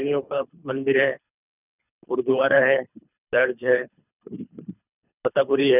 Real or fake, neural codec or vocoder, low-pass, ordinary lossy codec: fake; codec, 24 kHz, 3 kbps, HILCodec; 3.6 kHz; none